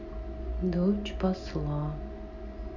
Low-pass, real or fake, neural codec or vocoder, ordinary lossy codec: 7.2 kHz; real; none; none